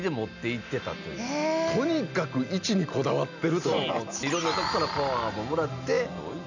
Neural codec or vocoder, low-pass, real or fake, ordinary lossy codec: none; 7.2 kHz; real; none